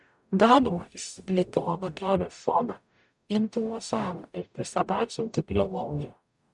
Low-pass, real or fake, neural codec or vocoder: 10.8 kHz; fake; codec, 44.1 kHz, 0.9 kbps, DAC